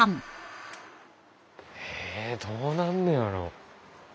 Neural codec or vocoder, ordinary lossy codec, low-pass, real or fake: none; none; none; real